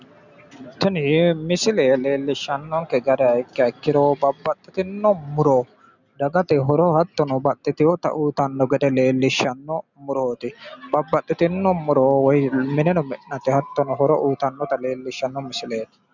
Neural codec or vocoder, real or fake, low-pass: none; real; 7.2 kHz